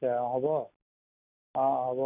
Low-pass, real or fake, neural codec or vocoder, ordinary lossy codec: 3.6 kHz; real; none; none